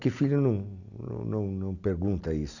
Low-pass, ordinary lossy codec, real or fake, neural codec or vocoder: 7.2 kHz; none; real; none